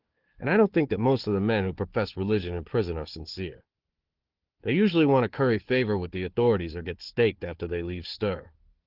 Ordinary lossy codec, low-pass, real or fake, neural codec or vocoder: Opus, 16 kbps; 5.4 kHz; fake; codec, 16 kHz, 4 kbps, FunCodec, trained on Chinese and English, 50 frames a second